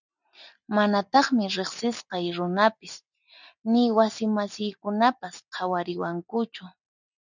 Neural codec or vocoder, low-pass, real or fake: none; 7.2 kHz; real